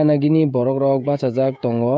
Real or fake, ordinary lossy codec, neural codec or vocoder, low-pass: fake; none; codec, 16 kHz, 16 kbps, FreqCodec, smaller model; none